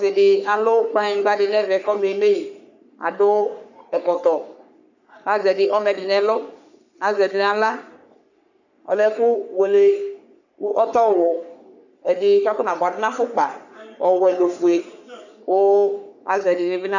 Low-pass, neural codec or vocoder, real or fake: 7.2 kHz; codec, 44.1 kHz, 3.4 kbps, Pupu-Codec; fake